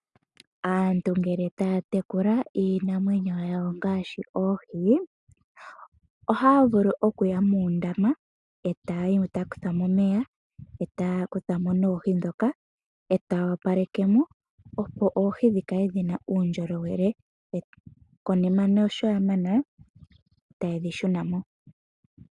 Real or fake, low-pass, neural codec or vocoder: real; 10.8 kHz; none